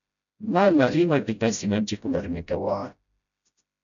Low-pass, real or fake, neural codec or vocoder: 7.2 kHz; fake; codec, 16 kHz, 0.5 kbps, FreqCodec, smaller model